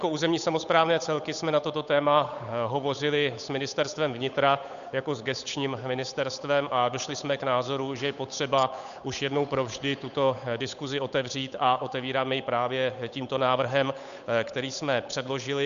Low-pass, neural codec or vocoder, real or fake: 7.2 kHz; codec, 16 kHz, 8 kbps, FunCodec, trained on Chinese and English, 25 frames a second; fake